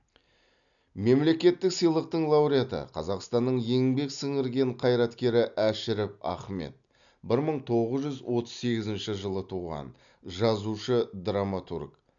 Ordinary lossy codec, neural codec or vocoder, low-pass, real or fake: none; none; 7.2 kHz; real